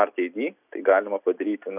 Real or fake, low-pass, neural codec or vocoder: real; 3.6 kHz; none